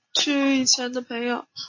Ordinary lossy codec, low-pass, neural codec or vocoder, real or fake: MP3, 32 kbps; 7.2 kHz; none; real